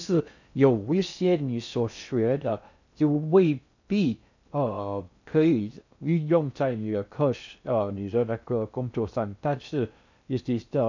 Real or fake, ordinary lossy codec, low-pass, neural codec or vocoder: fake; none; 7.2 kHz; codec, 16 kHz in and 24 kHz out, 0.6 kbps, FocalCodec, streaming, 2048 codes